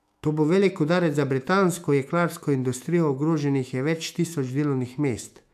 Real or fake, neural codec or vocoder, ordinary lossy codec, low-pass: fake; autoencoder, 48 kHz, 128 numbers a frame, DAC-VAE, trained on Japanese speech; none; 14.4 kHz